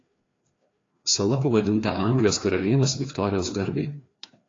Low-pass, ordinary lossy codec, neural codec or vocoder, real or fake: 7.2 kHz; AAC, 32 kbps; codec, 16 kHz, 2 kbps, FreqCodec, larger model; fake